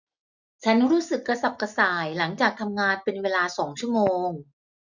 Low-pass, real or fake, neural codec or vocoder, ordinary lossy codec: 7.2 kHz; real; none; none